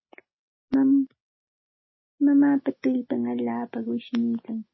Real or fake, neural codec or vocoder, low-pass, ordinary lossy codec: real; none; 7.2 kHz; MP3, 24 kbps